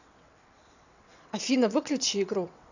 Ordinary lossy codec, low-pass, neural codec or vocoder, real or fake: none; 7.2 kHz; vocoder, 22.05 kHz, 80 mel bands, WaveNeXt; fake